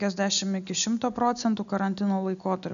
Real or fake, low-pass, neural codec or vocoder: real; 7.2 kHz; none